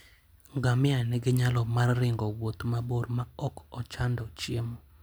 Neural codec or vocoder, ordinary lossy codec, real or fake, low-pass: none; none; real; none